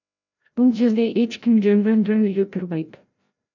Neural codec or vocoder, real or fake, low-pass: codec, 16 kHz, 0.5 kbps, FreqCodec, larger model; fake; 7.2 kHz